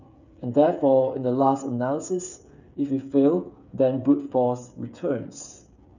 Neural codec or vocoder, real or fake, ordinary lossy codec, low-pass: codec, 16 kHz, 8 kbps, FreqCodec, smaller model; fake; none; 7.2 kHz